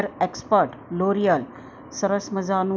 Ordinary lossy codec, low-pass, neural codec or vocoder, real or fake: none; none; none; real